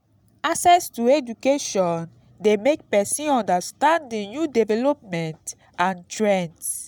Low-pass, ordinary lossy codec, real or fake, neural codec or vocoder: none; none; real; none